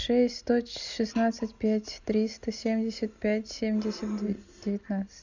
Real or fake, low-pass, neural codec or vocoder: real; 7.2 kHz; none